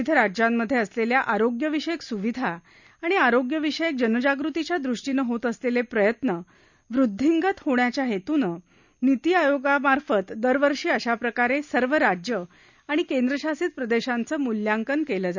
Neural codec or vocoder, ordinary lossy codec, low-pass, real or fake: none; none; 7.2 kHz; real